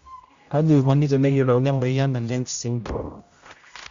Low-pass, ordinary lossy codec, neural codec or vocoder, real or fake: 7.2 kHz; Opus, 64 kbps; codec, 16 kHz, 0.5 kbps, X-Codec, HuBERT features, trained on general audio; fake